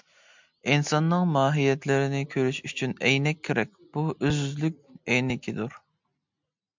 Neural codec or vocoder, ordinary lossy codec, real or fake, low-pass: none; MP3, 64 kbps; real; 7.2 kHz